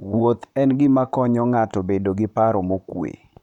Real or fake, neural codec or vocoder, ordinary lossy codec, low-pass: fake; vocoder, 44.1 kHz, 128 mel bands, Pupu-Vocoder; none; 19.8 kHz